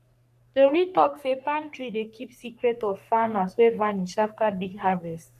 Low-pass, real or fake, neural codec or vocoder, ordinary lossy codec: 14.4 kHz; fake; codec, 44.1 kHz, 3.4 kbps, Pupu-Codec; none